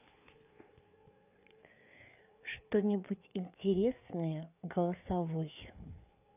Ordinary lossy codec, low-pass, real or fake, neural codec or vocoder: none; 3.6 kHz; fake; codec, 16 kHz, 4 kbps, FreqCodec, larger model